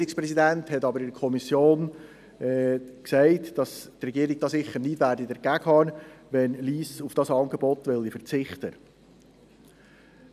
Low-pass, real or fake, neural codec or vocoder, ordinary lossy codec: 14.4 kHz; real; none; none